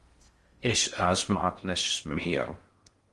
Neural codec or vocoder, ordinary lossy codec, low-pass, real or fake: codec, 16 kHz in and 24 kHz out, 0.6 kbps, FocalCodec, streaming, 4096 codes; Opus, 24 kbps; 10.8 kHz; fake